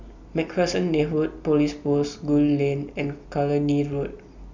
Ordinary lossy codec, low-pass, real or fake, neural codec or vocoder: Opus, 64 kbps; 7.2 kHz; real; none